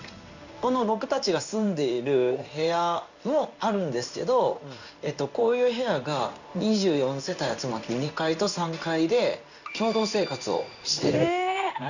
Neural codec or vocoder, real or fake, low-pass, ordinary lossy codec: codec, 16 kHz in and 24 kHz out, 1 kbps, XY-Tokenizer; fake; 7.2 kHz; none